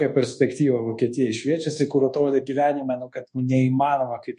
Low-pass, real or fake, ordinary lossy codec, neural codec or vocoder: 10.8 kHz; fake; MP3, 48 kbps; codec, 24 kHz, 1.2 kbps, DualCodec